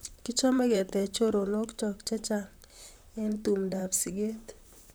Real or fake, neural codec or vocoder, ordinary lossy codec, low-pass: fake; vocoder, 44.1 kHz, 128 mel bands, Pupu-Vocoder; none; none